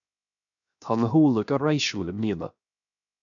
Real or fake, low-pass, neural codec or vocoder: fake; 7.2 kHz; codec, 16 kHz, 0.7 kbps, FocalCodec